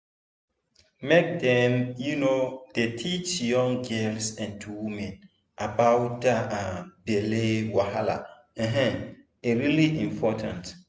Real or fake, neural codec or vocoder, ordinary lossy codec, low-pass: real; none; none; none